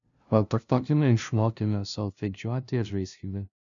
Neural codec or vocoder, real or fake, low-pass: codec, 16 kHz, 0.5 kbps, FunCodec, trained on LibriTTS, 25 frames a second; fake; 7.2 kHz